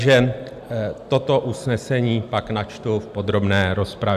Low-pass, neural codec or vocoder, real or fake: 14.4 kHz; none; real